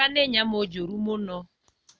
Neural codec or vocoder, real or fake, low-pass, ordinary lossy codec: none; real; none; none